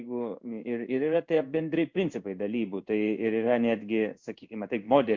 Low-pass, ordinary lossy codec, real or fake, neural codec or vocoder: 7.2 kHz; MP3, 64 kbps; fake; codec, 16 kHz in and 24 kHz out, 1 kbps, XY-Tokenizer